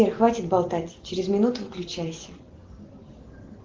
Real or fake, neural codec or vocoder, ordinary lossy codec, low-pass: real; none; Opus, 16 kbps; 7.2 kHz